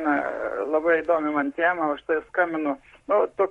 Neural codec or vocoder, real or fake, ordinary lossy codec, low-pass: codec, 44.1 kHz, 7.8 kbps, Pupu-Codec; fake; MP3, 48 kbps; 19.8 kHz